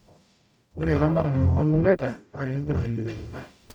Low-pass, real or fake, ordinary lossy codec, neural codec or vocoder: 19.8 kHz; fake; none; codec, 44.1 kHz, 0.9 kbps, DAC